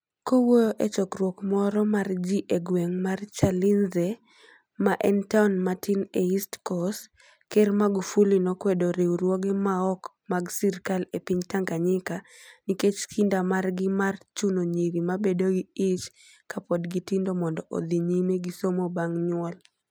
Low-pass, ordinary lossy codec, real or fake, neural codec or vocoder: none; none; real; none